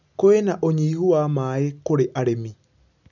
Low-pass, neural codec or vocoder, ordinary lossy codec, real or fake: 7.2 kHz; none; none; real